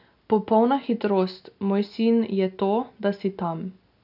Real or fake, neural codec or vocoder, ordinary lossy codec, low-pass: real; none; none; 5.4 kHz